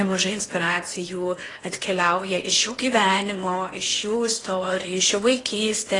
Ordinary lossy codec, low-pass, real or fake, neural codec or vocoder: AAC, 32 kbps; 10.8 kHz; fake; codec, 16 kHz in and 24 kHz out, 0.8 kbps, FocalCodec, streaming, 65536 codes